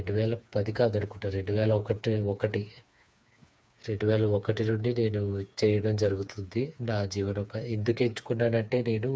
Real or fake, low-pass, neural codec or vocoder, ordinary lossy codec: fake; none; codec, 16 kHz, 4 kbps, FreqCodec, smaller model; none